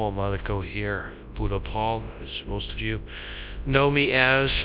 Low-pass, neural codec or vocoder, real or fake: 5.4 kHz; codec, 24 kHz, 0.9 kbps, WavTokenizer, large speech release; fake